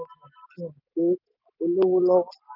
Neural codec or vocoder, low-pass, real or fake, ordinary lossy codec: none; 5.4 kHz; real; none